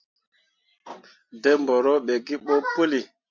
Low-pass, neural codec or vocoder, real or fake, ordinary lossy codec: 7.2 kHz; none; real; MP3, 48 kbps